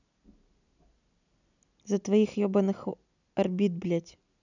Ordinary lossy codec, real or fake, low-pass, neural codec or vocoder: none; real; 7.2 kHz; none